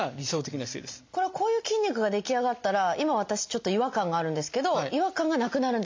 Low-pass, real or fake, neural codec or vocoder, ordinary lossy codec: 7.2 kHz; real; none; MP3, 64 kbps